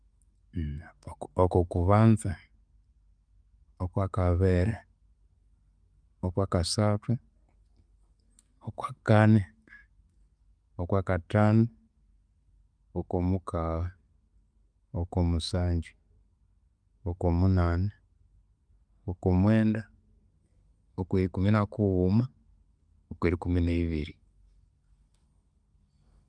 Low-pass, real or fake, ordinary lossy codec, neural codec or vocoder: 9.9 kHz; real; Opus, 32 kbps; none